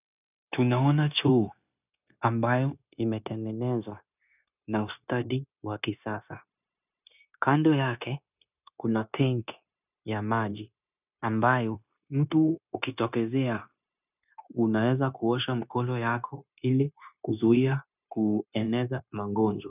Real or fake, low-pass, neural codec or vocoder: fake; 3.6 kHz; codec, 16 kHz, 0.9 kbps, LongCat-Audio-Codec